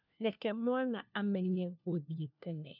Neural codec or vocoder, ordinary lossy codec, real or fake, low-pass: codec, 16 kHz, 1 kbps, FunCodec, trained on LibriTTS, 50 frames a second; none; fake; 5.4 kHz